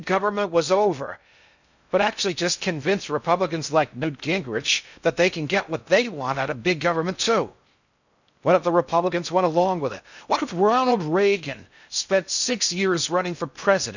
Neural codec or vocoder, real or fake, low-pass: codec, 16 kHz in and 24 kHz out, 0.6 kbps, FocalCodec, streaming, 2048 codes; fake; 7.2 kHz